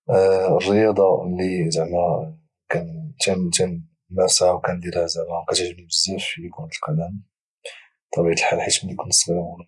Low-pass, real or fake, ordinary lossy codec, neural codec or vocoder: 10.8 kHz; real; none; none